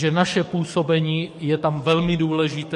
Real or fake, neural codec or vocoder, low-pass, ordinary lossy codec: fake; codec, 44.1 kHz, 7.8 kbps, DAC; 14.4 kHz; MP3, 48 kbps